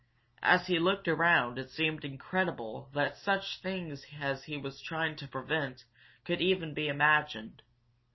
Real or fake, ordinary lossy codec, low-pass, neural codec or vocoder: real; MP3, 24 kbps; 7.2 kHz; none